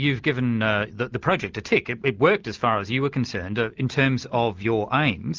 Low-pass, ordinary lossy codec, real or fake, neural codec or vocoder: 7.2 kHz; Opus, 24 kbps; real; none